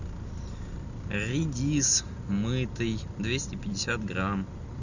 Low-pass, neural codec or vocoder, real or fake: 7.2 kHz; none; real